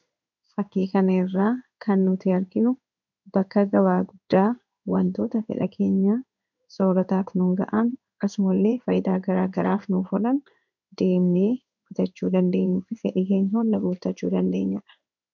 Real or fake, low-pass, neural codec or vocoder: fake; 7.2 kHz; codec, 16 kHz in and 24 kHz out, 1 kbps, XY-Tokenizer